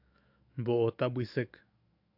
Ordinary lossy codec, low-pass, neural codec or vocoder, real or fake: AAC, 48 kbps; 5.4 kHz; autoencoder, 48 kHz, 128 numbers a frame, DAC-VAE, trained on Japanese speech; fake